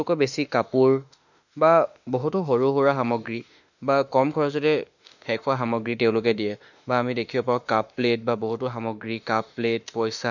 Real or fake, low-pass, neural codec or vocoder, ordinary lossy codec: fake; 7.2 kHz; autoencoder, 48 kHz, 32 numbers a frame, DAC-VAE, trained on Japanese speech; none